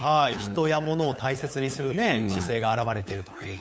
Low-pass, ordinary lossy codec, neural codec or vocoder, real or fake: none; none; codec, 16 kHz, 8 kbps, FunCodec, trained on LibriTTS, 25 frames a second; fake